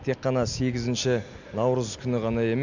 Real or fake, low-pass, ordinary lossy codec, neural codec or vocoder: real; 7.2 kHz; none; none